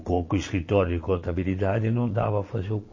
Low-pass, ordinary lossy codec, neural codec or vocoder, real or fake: 7.2 kHz; MP3, 32 kbps; vocoder, 22.05 kHz, 80 mel bands, Vocos; fake